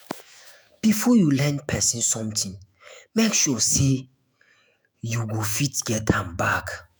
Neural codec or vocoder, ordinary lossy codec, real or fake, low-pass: autoencoder, 48 kHz, 128 numbers a frame, DAC-VAE, trained on Japanese speech; none; fake; none